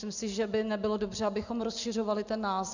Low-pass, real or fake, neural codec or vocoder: 7.2 kHz; real; none